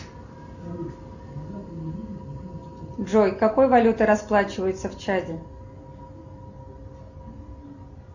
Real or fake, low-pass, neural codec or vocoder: real; 7.2 kHz; none